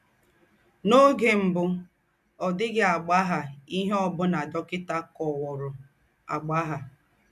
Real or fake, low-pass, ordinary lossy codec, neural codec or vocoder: real; 14.4 kHz; none; none